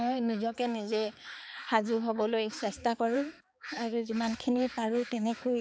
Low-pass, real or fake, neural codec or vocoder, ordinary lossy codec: none; fake; codec, 16 kHz, 4 kbps, X-Codec, HuBERT features, trained on balanced general audio; none